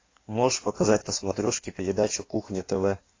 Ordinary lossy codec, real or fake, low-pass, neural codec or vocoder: AAC, 32 kbps; fake; 7.2 kHz; codec, 16 kHz in and 24 kHz out, 1.1 kbps, FireRedTTS-2 codec